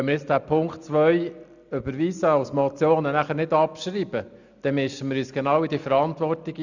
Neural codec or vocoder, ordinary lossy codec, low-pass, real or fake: none; none; 7.2 kHz; real